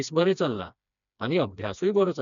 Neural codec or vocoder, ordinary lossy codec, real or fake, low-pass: codec, 16 kHz, 2 kbps, FreqCodec, smaller model; none; fake; 7.2 kHz